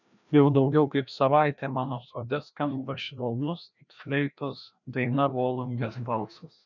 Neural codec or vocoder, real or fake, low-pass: codec, 16 kHz, 1 kbps, FreqCodec, larger model; fake; 7.2 kHz